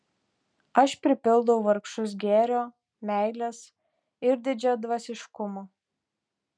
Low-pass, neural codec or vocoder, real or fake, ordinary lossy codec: 9.9 kHz; none; real; MP3, 96 kbps